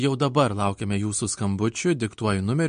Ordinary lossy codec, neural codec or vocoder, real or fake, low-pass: MP3, 48 kbps; none; real; 9.9 kHz